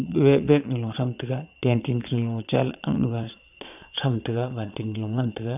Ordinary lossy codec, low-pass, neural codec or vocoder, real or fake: none; 3.6 kHz; vocoder, 22.05 kHz, 80 mel bands, Vocos; fake